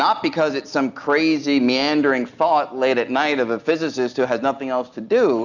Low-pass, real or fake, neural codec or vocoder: 7.2 kHz; real; none